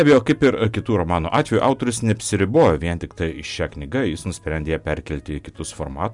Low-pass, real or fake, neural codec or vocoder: 10.8 kHz; real; none